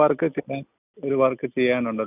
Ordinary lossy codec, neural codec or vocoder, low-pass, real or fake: none; none; 3.6 kHz; real